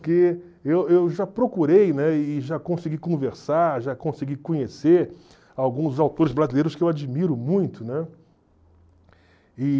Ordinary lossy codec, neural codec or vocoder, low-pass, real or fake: none; none; none; real